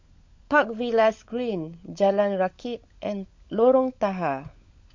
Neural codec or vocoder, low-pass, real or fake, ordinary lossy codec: codec, 16 kHz, 16 kbps, FunCodec, trained on LibriTTS, 50 frames a second; 7.2 kHz; fake; MP3, 48 kbps